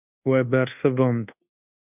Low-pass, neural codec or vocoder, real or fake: 3.6 kHz; codec, 24 kHz, 1.2 kbps, DualCodec; fake